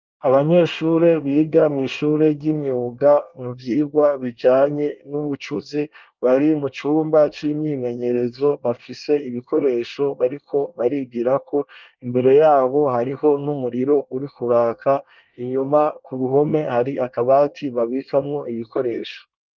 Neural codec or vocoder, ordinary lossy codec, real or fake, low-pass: codec, 24 kHz, 1 kbps, SNAC; Opus, 24 kbps; fake; 7.2 kHz